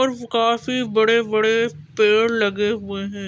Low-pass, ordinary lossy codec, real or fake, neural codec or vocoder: none; none; real; none